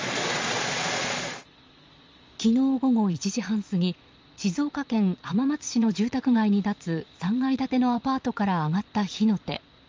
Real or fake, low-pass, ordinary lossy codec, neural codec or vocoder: fake; 7.2 kHz; Opus, 32 kbps; vocoder, 22.05 kHz, 80 mel bands, Vocos